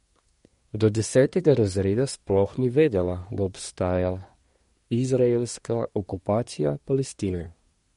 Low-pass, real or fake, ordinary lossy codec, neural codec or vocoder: 10.8 kHz; fake; MP3, 48 kbps; codec, 24 kHz, 1 kbps, SNAC